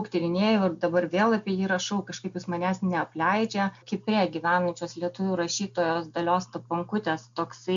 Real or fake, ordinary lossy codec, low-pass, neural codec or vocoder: real; MP3, 64 kbps; 7.2 kHz; none